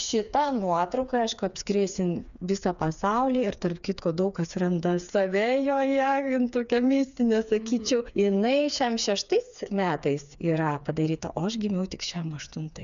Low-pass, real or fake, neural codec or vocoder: 7.2 kHz; fake; codec, 16 kHz, 4 kbps, FreqCodec, smaller model